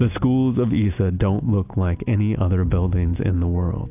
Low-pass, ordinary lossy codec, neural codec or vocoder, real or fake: 3.6 kHz; AAC, 32 kbps; none; real